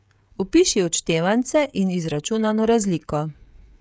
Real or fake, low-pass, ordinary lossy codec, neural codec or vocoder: fake; none; none; codec, 16 kHz, 16 kbps, FreqCodec, smaller model